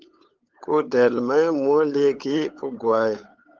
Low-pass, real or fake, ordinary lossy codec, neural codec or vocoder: 7.2 kHz; fake; Opus, 16 kbps; codec, 16 kHz, 8 kbps, FunCodec, trained on LibriTTS, 25 frames a second